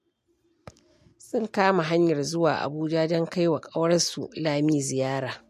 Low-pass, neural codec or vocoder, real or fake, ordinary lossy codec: 14.4 kHz; none; real; MP3, 64 kbps